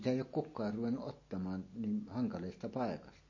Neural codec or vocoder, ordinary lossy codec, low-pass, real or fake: none; MP3, 32 kbps; 7.2 kHz; real